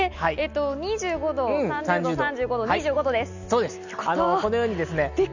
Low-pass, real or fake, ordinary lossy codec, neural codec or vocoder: 7.2 kHz; real; none; none